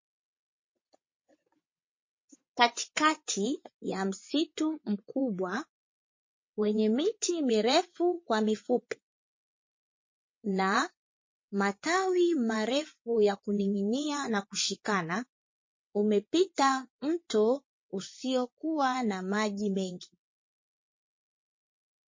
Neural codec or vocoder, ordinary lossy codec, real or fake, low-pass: vocoder, 22.05 kHz, 80 mel bands, WaveNeXt; MP3, 32 kbps; fake; 7.2 kHz